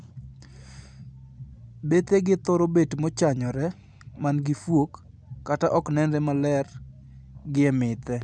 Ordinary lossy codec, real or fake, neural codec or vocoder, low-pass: none; real; none; 9.9 kHz